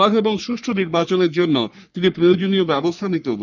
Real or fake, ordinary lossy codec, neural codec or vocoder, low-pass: fake; none; codec, 44.1 kHz, 3.4 kbps, Pupu-Codec; 7.2 kHz